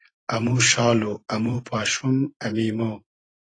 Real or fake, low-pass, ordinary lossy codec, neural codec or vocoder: fake; 9.9 kHz; AAC, 32 kbps; vocoder, 44.1 kHz, 128 mel bands every 512 samples, BigVGAN v2